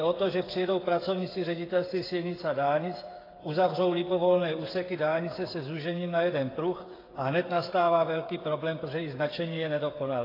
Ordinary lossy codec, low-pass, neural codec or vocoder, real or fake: AAC, 24 kbps; 5.4 kHz; codec, 16 kHz, 8 kbps, FreqCodec, smaller model; fake